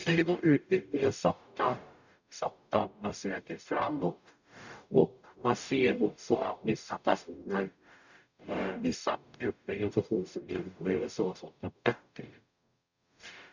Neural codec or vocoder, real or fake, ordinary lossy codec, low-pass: codec, 44.1 kHz, 0.9 kbps, DAC; fake; none; 7.2 kHz